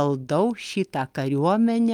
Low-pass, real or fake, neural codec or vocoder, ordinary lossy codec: 19.8 kHz; real; none; Opus, 32 kbps